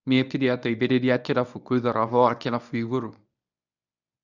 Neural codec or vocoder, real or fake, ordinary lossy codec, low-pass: codec, 24 kHz, 0.9 kbps, WavTokenizer, medium speech release version 1; fake; none; 7.2 kHz